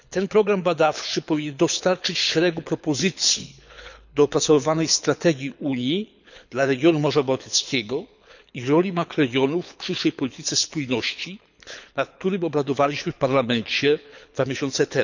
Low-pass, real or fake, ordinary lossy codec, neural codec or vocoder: 7.2 kHz; fake; none; codec, 24 kHz, 6 kbps, HILCodec